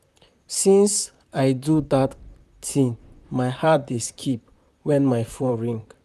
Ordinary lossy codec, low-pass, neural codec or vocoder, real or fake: none; 14.4 kHz; vocoder, 44.1 kHz, 128 mel bands, Pupu-Vocoder; fake